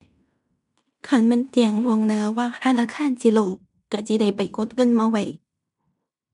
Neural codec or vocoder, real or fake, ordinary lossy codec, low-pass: codec, 16 kHz in and 24 kHz out, 0.9 kbps, LongCat-Audio-Codec, fine tuned four codebook decoder; fake; none; 10.8 kHz